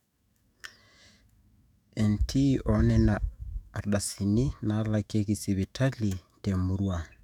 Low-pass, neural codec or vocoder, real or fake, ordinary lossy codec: 19.8 kHz; autoencoder, 48 kHz, 128 numbers a frame, DAC-VAE, trained on Japanese speech; fake; none